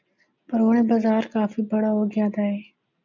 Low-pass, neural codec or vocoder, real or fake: 7.2 kHz; none; real